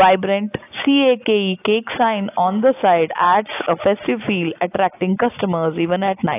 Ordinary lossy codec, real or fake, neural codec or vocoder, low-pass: AAC, 24 kbps; real; none; 3.6 kHz